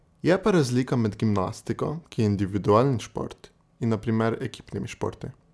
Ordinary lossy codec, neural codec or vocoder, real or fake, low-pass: none; none; real; none